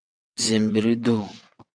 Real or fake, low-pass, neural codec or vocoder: fake; 9.9 kHz; vocoder, 22.05 kHz, 80 mel bands, WaveNeXt